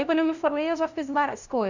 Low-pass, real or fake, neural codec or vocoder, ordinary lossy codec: 7.2 kHz; fake; codec, 16 kHz, 0.5 kbps, FunCodec, trained on LibriTTS, 25 frames a second; none